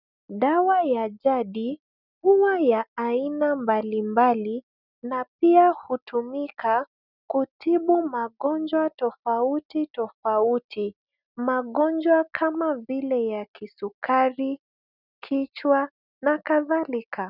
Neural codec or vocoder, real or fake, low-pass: none; real; 5.4 kHz